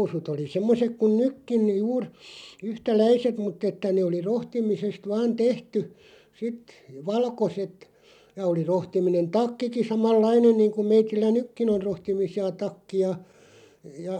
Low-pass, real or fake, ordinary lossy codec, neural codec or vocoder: 19.8 kHz; real; none; none